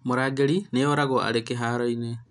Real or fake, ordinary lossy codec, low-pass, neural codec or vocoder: real; none; 10.8 kHz; none